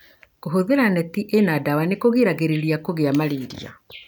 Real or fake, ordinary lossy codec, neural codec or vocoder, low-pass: real; none; none; none